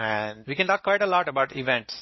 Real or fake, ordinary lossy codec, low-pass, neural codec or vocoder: real; MP3, 24 kbps; 7.2 kHz; none